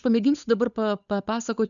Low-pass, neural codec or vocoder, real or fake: 7.2 kHz; codec, 16 kHz, 8 kbps, FunCodec, trained on Chinese and English, 25 frames a second; fake